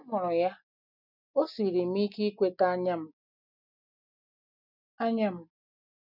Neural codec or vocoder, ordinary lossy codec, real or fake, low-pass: none; none; real; 5.4 kHz